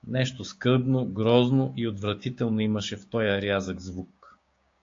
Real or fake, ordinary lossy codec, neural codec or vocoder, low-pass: fake; AAC, 48 kbps; codec, 16 kHz, 6 kbps, DAC; 7.2 kHz